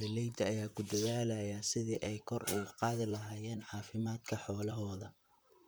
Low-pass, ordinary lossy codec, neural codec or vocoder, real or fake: none; none; vocoder, 44.1 kHz, 128 mel bands, Pupu-Vocoder; fake